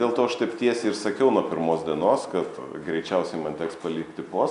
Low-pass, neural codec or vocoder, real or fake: 10.8 kHz; none; real